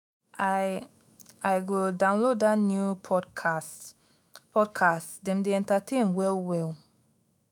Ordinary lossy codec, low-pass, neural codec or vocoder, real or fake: none; none; autoencoder, 48 kHz, 128 numbers a frame, DAC-VAE, trained on Japanese speech; fake